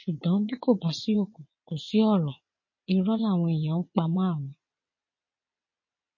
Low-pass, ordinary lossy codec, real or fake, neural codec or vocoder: 7.2 kHz; MP3, 32 kbps; fake; vocoder, 22.05 kHz, 80 mel bands, WaveNeXt